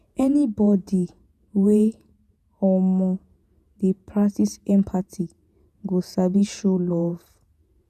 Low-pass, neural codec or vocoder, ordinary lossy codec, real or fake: 14.4 kHz; vocoder, 48 kHz, 128 mel bands, Vocos; none; fake